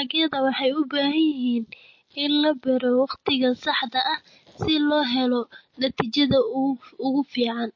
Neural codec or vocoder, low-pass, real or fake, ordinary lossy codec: none; 7.2 kHz; real; MP3, 32 kbps